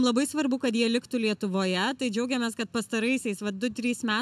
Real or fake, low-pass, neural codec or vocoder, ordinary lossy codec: real; 14.4 kHz; none; AAC, 96 kbps